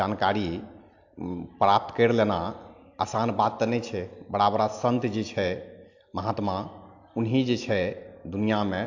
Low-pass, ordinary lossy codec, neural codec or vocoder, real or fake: 7.2 kHz; AAC, 48 kbps; none; real